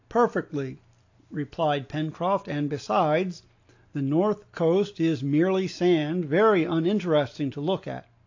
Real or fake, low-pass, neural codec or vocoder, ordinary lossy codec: real; 7.2 kHz; none; AAC, 48 kbps